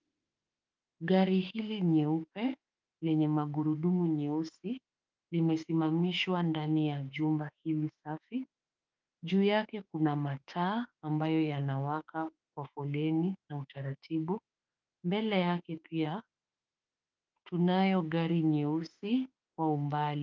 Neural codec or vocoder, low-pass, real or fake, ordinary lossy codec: autoencoder, 48 kHz, 32 numbers a frame, DAC-VAE, trained on Japanese speech; 7.2 kHz; fake; Opus, 24 kbps